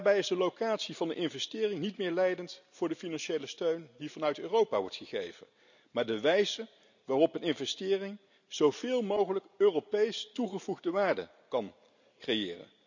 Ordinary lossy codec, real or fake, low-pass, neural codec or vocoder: none; real; 7.2 kHz; none